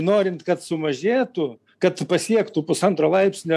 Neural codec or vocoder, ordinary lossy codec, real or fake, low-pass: none; AAC, 96 kbps; real; 14.4 kHz